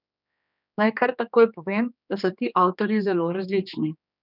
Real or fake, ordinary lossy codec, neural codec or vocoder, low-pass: fake; none; codec, 16 kHz, 2 kbps, X-Codec, HuBERT features, trained on general audio; 5.4 kHz